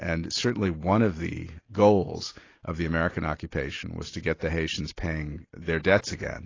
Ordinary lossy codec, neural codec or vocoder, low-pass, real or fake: AAC, 32 kbps; none; 7.2 kHz; real